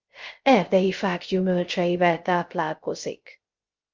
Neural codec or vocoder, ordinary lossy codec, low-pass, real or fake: codec, 16 kHz, 0.3 kbps, FocalCodec; Opus, 32 kbps; 7.2 kHz; fake